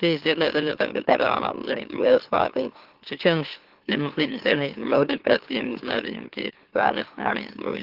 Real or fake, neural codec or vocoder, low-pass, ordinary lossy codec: fake; autoencoder, 44.1 kHz, a latent of 192 numbers a frame, MeloTTS; 5.4 kHz; Opus, 16 kbps